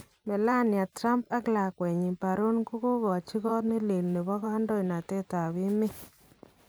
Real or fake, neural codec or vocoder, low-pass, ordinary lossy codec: fake; vocoder, 44.1 kHz, 128 mel bands every 512 samples, BigVGAN v2; none; none